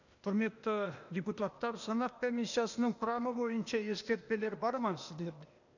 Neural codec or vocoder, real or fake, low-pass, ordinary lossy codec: codec, 16 kHz, 0.8 kbps, ZipCodec; fake; 7.2 kHz; none